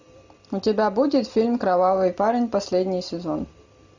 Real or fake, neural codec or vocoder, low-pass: real; none; 7.2 kHz